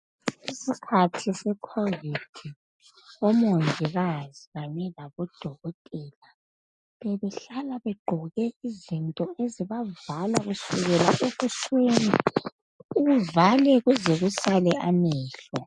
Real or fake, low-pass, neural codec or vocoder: real; 10.8 kHz; none